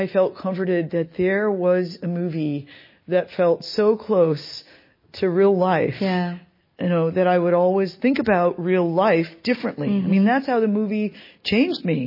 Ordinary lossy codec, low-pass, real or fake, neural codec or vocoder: MP3, 24 kbps; 5.4 kHz; real; none